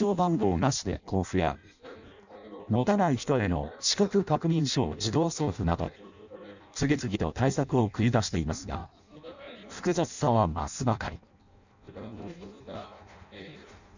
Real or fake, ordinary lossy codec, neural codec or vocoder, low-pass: fake; none; codec, 16 kHz in and 24 kHz out, 0.6 kbps, FireRedTTS-2 codec; 7.2 kHz